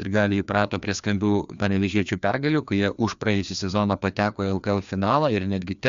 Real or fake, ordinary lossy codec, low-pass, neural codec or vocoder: fake; MP3, 64 kbps; 7.2 kHz; codec, 16 kHz, 2 kbps, FreqCodec, larger model